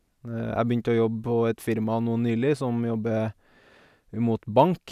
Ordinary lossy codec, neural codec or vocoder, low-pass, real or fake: none; none; 14.4 kHz; real